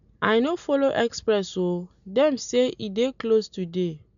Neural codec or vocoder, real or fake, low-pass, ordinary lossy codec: none; real; 7.2 kHz; none